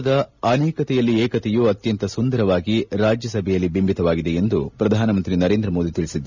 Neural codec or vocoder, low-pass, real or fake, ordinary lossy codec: none; 7.2 kHz; real; none